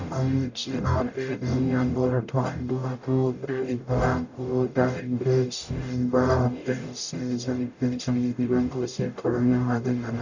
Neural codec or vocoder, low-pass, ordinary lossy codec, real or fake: codec, 44.1 kHz, 0.9 kbps, DAC; 7.2 kHz; none; fake